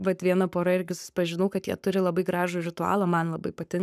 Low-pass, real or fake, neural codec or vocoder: 14.4 kHz; fake; codec, 44.1 kHz, 7.8 kbps, Pupu-Codec